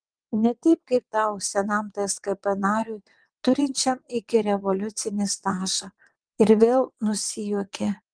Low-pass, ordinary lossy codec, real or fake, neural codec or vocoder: 9.9 kHz; Opus, 24 kbps; fake; vocoder, 22.05 kHz, 80 mel bands, WaveNeXt